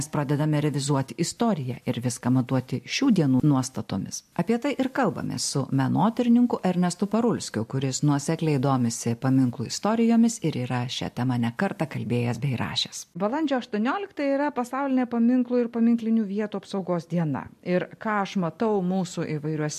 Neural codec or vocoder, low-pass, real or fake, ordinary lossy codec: none; 14.4 kHz; real; MP3, 64 kbps